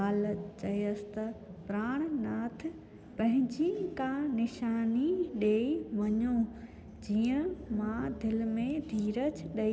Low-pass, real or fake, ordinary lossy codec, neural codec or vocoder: none; real; none; none